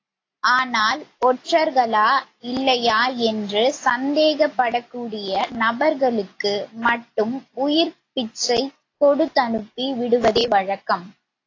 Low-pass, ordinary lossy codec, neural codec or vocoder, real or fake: 7.2 kHz; AAC, 32 kbps; none; real